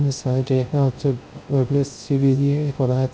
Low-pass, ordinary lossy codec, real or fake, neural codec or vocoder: none; none; fake; codec, 16 kHz, 0.3 kbps, FocalCodec